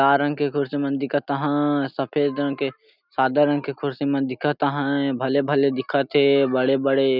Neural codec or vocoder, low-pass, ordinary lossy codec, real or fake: none; 5.4 kHz; none; real